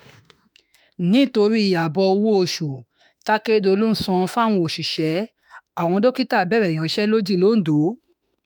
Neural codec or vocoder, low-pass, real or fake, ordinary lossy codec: autoencoder, 48 kHz, 32 numbers a frame, DAC-VAE, trained on Japanese speech; none; fake; none